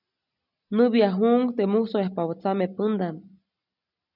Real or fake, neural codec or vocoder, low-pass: real; none; 5.4 kHz